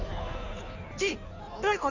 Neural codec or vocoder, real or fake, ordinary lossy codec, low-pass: codec, 16 kHz in and 24 kHz out, 1.1 kbps, FireRedTTS-2 codec; fake; none; 7.2 kHz